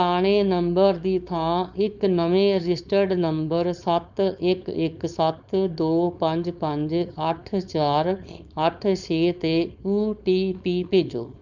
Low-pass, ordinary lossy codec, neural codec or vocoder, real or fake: 7.2 kHz; none; codec, 16 kHz, 4.8 kbps, FACodec; fake